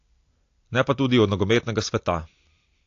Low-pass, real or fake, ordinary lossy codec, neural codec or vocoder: 7.2 kHz; real; AAC, 48 kbps; none